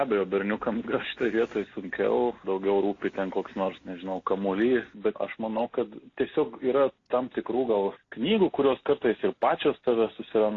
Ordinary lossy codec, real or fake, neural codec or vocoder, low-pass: AAC, 32 kbps; real; none; 7.2 kHz